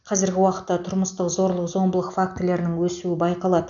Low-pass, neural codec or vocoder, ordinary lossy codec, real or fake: 7.2 kHz; none; none; real